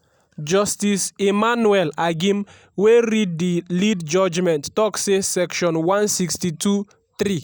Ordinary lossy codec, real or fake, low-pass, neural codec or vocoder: none; real; none; none